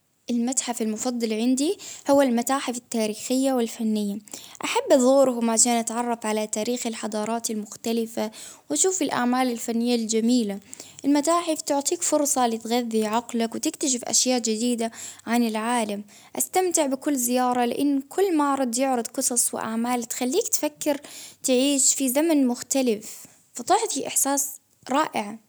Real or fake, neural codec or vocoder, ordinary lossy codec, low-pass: real; none; none; none